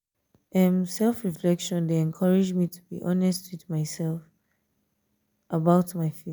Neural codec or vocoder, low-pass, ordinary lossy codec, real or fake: none; none; none; real